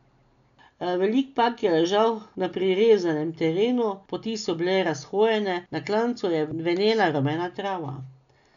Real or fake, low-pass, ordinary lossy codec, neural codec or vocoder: real; 7.2 kHz; none; none